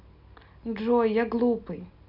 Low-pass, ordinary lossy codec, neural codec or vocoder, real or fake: 5.4 kHz; none; none; real